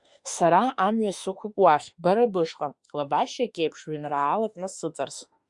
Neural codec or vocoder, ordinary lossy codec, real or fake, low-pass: autoencoder, 48 kHz, 32 numbers a frame, DAC-VAE, trained on Japanese speech; Opus, 64 kbps; fake; 10.8 kHz